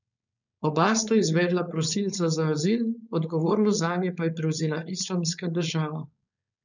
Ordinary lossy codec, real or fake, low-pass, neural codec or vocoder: none; fake; 7.2 kHz; codec, 16 kHz, 4.8 kbps, FACodec